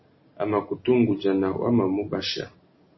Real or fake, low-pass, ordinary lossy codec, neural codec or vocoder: real; 7.2 kHz; MP3, 24 kbps; none